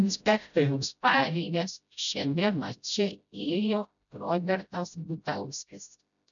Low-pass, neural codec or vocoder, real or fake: 7.2 kHz; codec, 16 kHz, 0.5 kbps, FreqCodec, smaller model; fake